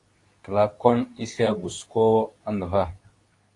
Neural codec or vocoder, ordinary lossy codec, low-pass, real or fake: codec, 24 kHz, 0.9 kbps, WavTokenizer, medium speech release version 2; AAC, 48 kbps; 10.8 kHz; fake